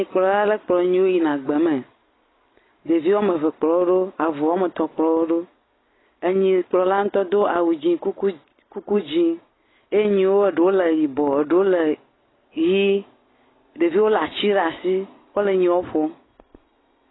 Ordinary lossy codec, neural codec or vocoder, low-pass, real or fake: AAC, 16 kbps; none; 7.2 kHz; real